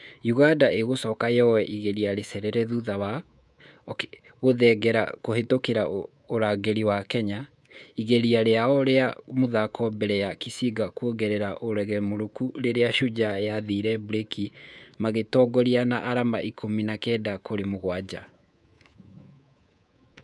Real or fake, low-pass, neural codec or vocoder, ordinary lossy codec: fake; none; codec, 24 kHz, 3.1 kbps, DualCodec; none